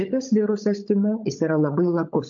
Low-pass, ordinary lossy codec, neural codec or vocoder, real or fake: 7.2 kHz; AAC, 64 kbps; codec, 16 kHz, 16 kbps, FunCodec, trained on LibriTTS, 50 frames a second; fake